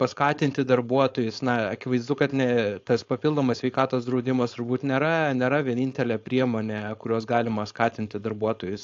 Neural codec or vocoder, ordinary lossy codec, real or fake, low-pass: codec, 16 kHz, 4.8 kbps, FACodec; AAC, 48 kbps; fake; 7.2 kHz